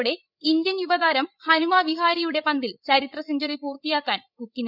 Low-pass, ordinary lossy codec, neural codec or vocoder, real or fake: 5.4 kHz; none; codec, 16 kHz, 16 kbps, FreqCodec, larger model; fake